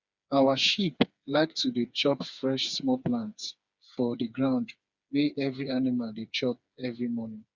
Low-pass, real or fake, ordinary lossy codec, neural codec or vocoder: 7.2 kHz; fake; Opus, 64 kbps; codec, 16 kHz, 4 kbps, FreqCodec, smaller model